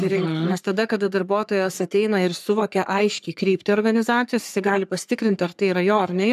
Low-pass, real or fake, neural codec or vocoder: 14.4 kHz; fake; codec, 44.1 kHz, 3.4 kbps, Pupu-Codec